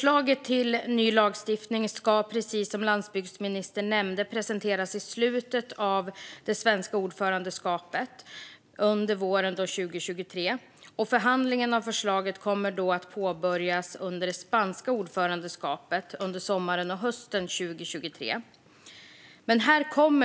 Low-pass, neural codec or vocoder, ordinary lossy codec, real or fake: none; none; none; real